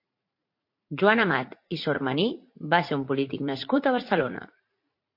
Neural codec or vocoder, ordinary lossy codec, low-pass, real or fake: vocoder, 44.1 kHz, 80 mel bands, Vocos; MP3, 32 kbps; 5.4 kHz; fake